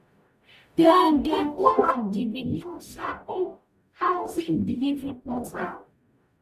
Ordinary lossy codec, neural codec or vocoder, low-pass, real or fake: none; codec, 44.1 kHz, 0.9 kbps, DAC; 14.4 kHz; fake